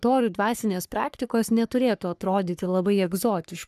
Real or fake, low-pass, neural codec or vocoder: fake; 14.4 kHz; codec, 44.1 kHz, 3.4 kbps, Pupu-Codec